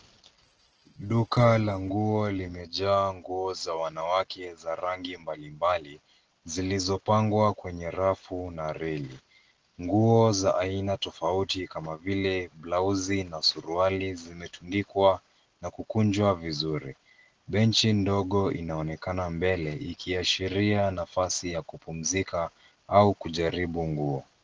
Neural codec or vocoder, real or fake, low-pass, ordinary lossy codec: none; real; 7.2 kHz; Opus, 16 kbps